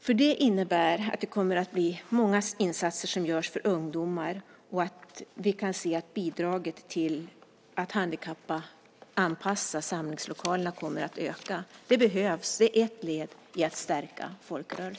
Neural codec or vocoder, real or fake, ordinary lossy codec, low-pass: none; real; none; none